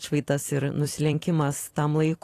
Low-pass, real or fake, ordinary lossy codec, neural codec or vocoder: 14.4 kHz; fake; AAC, 48 kbps; vocoder, 44.1 kHz, 128 mel bands every 256 samples, BigVGAN v2